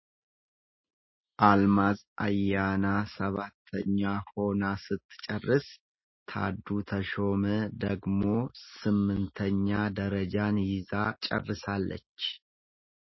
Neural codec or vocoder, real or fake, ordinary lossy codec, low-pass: none; real; MP3, 24 kbps; 7.2 kHz